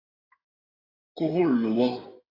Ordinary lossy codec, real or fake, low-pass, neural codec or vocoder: AAC, 24 kbps; fake; 5.4 kHz; codec, 16 kHz in and 24 kHz out, 2.2 kbps, FireRedTTS-2 codec